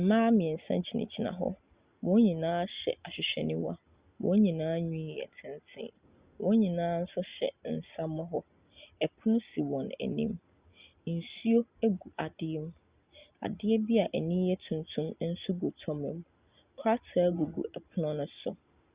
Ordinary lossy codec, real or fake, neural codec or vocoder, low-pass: Opus, 64 kbps; real; none; 3.6 kHz